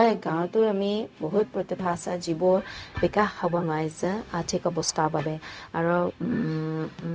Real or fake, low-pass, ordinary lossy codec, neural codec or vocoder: fake; none; none; codec, 16 kHz, 0.4 kbps, LongCat-Audio-Codec